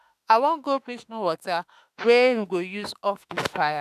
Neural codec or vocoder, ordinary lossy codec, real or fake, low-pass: autoencoder, 48 kHz, 32 numbers a frame, DAC-VAE, trained on Japanese speech; none; fake; 14.4 kHz